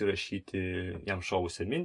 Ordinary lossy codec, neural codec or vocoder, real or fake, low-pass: MP3, 48 kbps; none; real; 10.8 kHz